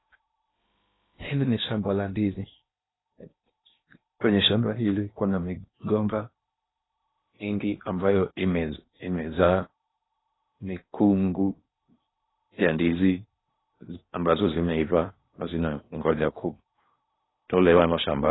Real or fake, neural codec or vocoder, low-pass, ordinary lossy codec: fake; codec, 16 kHz in and 24 kHz out, 0.8 kbps, FocalCodec, streaming, 65536 codes; 7.2 kHz; AAC, 16 kbps